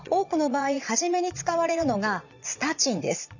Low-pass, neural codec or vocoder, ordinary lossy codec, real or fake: 7.2 kHz; vocoder, 22.05 kHz, 80 mel bands, Vocos; none; fake